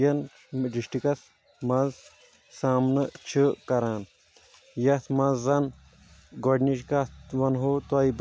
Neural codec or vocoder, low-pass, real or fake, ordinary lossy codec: none; none; real; none